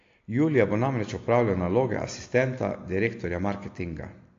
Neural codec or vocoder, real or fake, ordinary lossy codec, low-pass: none; real; AAC, 48 kbps; 7.2 kHz